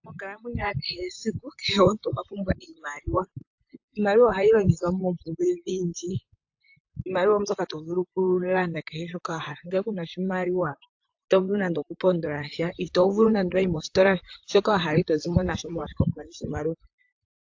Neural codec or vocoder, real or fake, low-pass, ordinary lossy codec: vocoder, 44.1 kHz, 128 mel bands, Pupu-Vocoder; fake; 7.2 kHz; AAC, 48 kbps